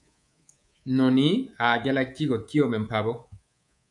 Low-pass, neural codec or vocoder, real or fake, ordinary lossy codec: 10.8 kHz; codec, 24 kHz, 3.1 kbps, DualCodec; fake; MP3, 96 kbps